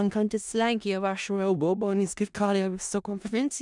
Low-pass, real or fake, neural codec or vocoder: 10.8 kHz; fake; codec, 16 kHz in and 24 kHz out, 0.4 kbps, LongCat-Audio-Codec, four codebook decoder